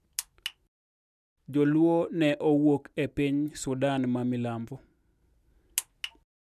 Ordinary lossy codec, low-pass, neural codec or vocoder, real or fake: none; 14.4 kHz; none; real